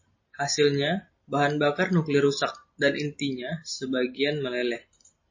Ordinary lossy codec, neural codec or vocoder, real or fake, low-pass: MP3, 32 kbps; none; real; 7.2 kHz